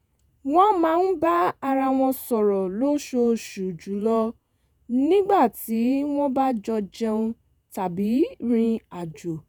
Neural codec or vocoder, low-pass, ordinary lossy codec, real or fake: vocoder, 48 kHz, 128 mel bands, Vocos; none; none; fake